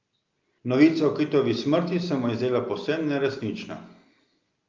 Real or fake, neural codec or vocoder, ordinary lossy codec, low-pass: real; none; Opus, 32 kbps; 7.2 kHz